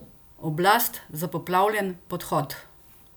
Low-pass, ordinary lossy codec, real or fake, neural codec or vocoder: none; none; real; none